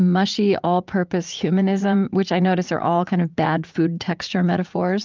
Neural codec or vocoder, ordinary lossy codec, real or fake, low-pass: vocoder, 44.1 kHz, 80 mel bands, Vocos; Opus, 24 kbps; fake; 7.2 kHz